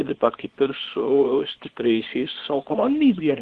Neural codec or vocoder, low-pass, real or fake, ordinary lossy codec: codec, 24 kHz, 0.9 kbps, WavTokenizer, small release; 10.8 kHz; fake; Opus, 16 kbps